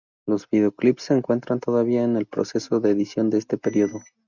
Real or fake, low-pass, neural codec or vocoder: real; 7.2 kHz; none